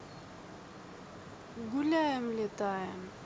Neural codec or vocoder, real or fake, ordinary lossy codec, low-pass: none; real; none; none